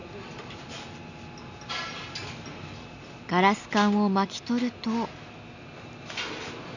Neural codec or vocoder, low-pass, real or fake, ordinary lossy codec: none; 7.2 kHz; real; none